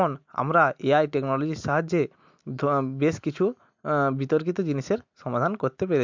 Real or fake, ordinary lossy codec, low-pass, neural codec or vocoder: real; MP3, 64 kbps; 7.2 kHz; none